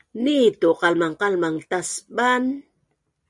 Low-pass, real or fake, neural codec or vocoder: 10.8 kHz; real; none